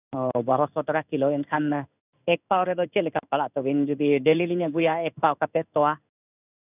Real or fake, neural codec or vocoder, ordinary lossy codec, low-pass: fake; codec, 16 kHz in and 24 kHz out, 1 kbps, XY-Tokenizer; none; 3.6 kHz